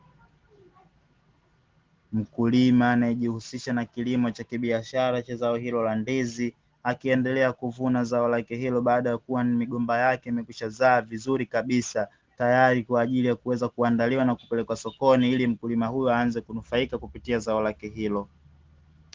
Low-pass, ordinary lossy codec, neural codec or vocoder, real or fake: 7.2 kHz; Opus, 16 kbps; none; real